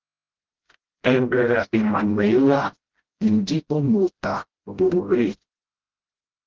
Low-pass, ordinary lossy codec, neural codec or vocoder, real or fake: 7.2 kHz; Opus, 16 kbps; codec, 16 kHz, 0.5 kbps, FreqCodec, smaller model; fake